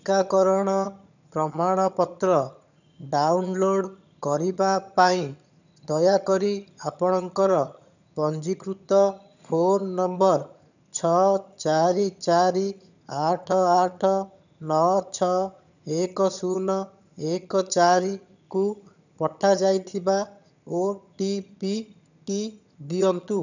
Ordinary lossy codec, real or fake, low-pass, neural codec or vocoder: none; fake; 7.2 kHz; vocoder, 22.05 kHz, 80 mel bands, HiFi-GAN